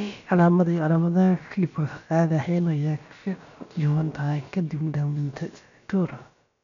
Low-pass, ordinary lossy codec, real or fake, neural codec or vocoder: 7.2 kHz; none; fake; codec, 16 kHz, about 1 kbps, DyCAST, with the encoder's durations